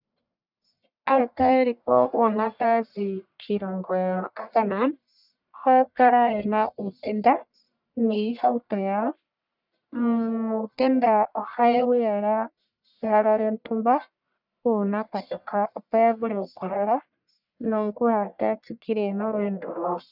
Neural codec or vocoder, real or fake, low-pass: codec, 44.1 kHz, 1.7 kbps, Pupu-Codec; fake; 5.4 kHz